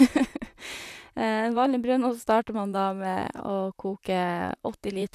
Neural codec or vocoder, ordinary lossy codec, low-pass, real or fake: vocoder, 44.1 kHz, 128 mel bands, Pupu-Vocoder; none; 14.4 kHz; fake